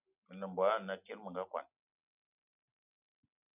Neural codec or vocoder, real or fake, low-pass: none; real; 3.6 kHz